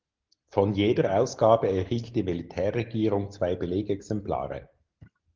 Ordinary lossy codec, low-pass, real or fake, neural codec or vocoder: Opus, 16 kbps; 7.2 kHz; real; none